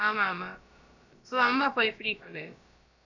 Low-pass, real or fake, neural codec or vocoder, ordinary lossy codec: 7.2 kHz; fake; codec, 16 kHz, about 1 kbps, DyCAST, with the encoder's durations; none